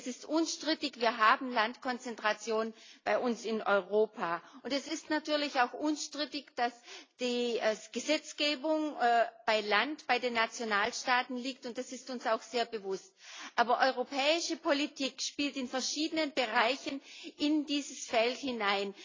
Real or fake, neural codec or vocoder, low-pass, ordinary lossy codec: real; none; 7.2 kHz; AAC, 32 kbps